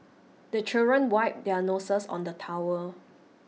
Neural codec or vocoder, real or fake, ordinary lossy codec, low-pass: none; real; none; none